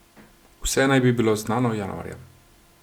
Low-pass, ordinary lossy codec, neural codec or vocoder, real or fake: 19.8 kHz; none; none; real